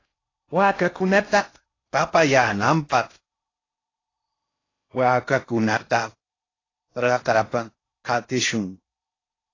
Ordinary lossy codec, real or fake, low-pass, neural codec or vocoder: AAC, 32 kbps; fake; 7.2 kHz; codec, 16 kHz in and 24 kHz out, 0.6 kbps, FocalCodec, streaming, 4096 codes